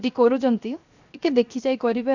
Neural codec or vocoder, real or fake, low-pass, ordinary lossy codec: codec, 16 kHz, 0.7 kbps, FocalCodec; fake; 7.2 kHz; none